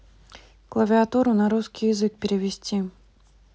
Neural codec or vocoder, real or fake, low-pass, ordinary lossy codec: none; real; none; none